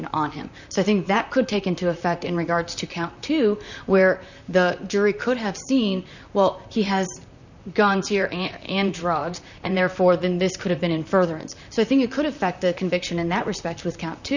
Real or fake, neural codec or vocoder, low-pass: fake; vocoder, 44.1 kHz, 128 mel bands, Pupu-Vocoder; 7.2 kHz